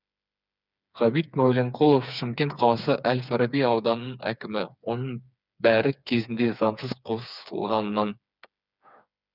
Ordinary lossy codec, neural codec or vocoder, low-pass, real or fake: none; codec, 16 kHz, 4 kbps, FreqCodec, smaller model; 5.4 kHz; fake